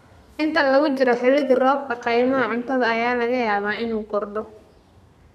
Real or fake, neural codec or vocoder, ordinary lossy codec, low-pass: fake; codec, 32 kHz, 1.9 kbps, SNAC; none; 14.4 kHz